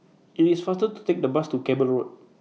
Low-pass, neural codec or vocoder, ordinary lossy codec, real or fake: none; none; none; real